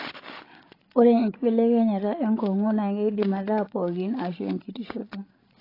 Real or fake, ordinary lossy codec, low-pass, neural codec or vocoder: fake; AAC, 24 kbps; 5.4 kHz; codec, 16 kHz, 16 kbps, FreqCodec, larger model